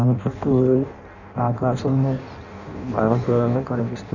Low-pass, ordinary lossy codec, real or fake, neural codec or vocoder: 7.2 kHz; none; fake; codec, 16 kHz in and 24 kHz out, 0.6 kbps, FireRedTTS-2 codec